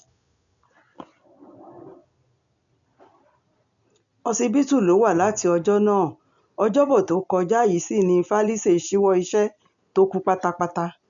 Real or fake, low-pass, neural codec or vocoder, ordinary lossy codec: real; 7.2 kHz; none; none